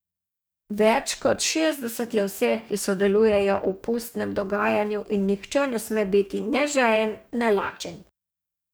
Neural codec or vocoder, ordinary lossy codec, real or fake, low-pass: codec, 44.1 kHz, 2.6 kbps, DAC; none; fake; none